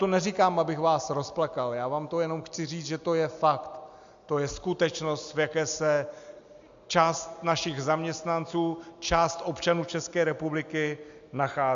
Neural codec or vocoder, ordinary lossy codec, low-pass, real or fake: none; MP3, 64 kbps; 7.2 kHz; real